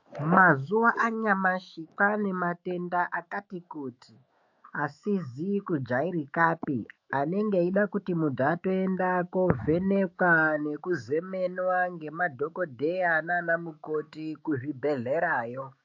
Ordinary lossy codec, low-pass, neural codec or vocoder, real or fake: AAC, 48 kbps; 7.2 kHz; autoencoder, 48 kHz, 128 numbers a frame, DAC-VAE, trained on Japanese speech; fake